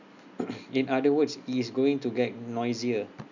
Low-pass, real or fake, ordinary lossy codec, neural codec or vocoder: 7.2 kHz; real; none; none